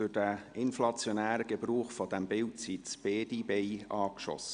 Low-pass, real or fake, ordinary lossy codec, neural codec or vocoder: 9.9 kHz; real; Opus, 64 kbps; none